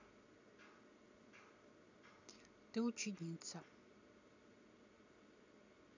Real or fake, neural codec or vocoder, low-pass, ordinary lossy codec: real; none; 7.2 kHz; none